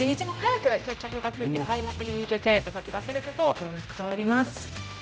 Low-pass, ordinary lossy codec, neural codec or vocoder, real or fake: none; none; codec, 16 kHz, 0.5 kbps, X-Codec, HuBERT features, trained on general audio; fake